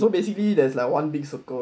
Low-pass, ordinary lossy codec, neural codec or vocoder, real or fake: none; none; none; real